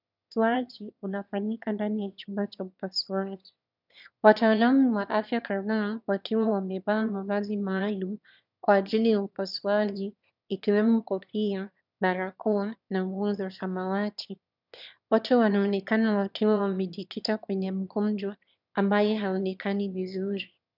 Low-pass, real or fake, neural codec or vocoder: 5.4 kHz; fake; autoencoder, 22.05 kHz, a latent of 192 numbers a frame, VITS, trained on one speaker